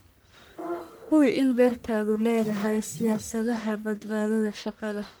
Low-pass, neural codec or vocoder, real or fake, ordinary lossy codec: none; codec, 44.1 kHz, 1.7 kbps, Pupu-Codec; fake; none